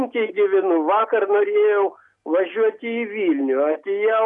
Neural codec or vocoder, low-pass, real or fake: none; 10.8 kHz; real